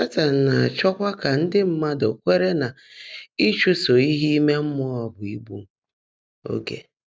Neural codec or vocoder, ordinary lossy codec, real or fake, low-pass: none; none; real; none